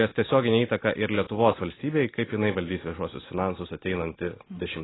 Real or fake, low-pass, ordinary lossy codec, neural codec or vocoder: real; 7.2 kHz; AAC, 16 kbps; none